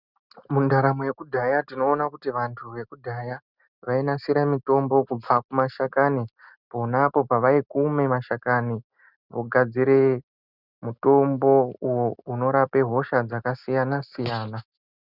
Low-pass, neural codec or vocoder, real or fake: 5.4 kHz; none; real